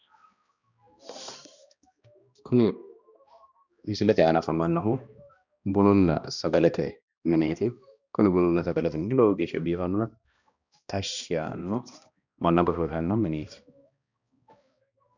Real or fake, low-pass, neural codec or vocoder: fake; 7.2 kHz; codec, 16 kHz, 1 kbps, X-Codec, HuBERT features, trained on balanced general audio